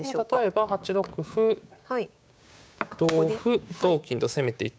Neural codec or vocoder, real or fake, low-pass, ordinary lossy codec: codec, 16 kHz, 6 kbps, DAC; fake; none; none